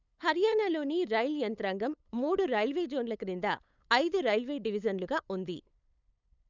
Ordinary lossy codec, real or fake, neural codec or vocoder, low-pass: none; fake; codec, 16 kHz, 8 kbps, FunCodec, trained on LibriTTS, 25 frames a second; 7.2 kHz